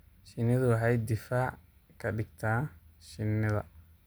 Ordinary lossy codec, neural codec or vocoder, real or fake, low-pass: none; none; real; none